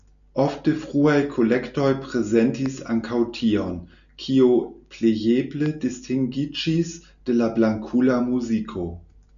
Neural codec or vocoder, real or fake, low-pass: none; real; 7.2 kHz